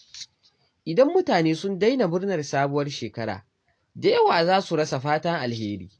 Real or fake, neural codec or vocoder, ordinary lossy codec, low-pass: real; none; AAC, 48 kbps; 9.9 kHz